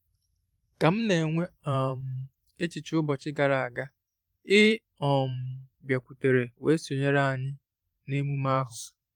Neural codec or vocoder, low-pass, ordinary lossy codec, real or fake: vocoder, 44.1 kHz, 128 mel bands every 512 samples, BigVGAN v2; 19.8 kHz; Opus, 32 kbps; fake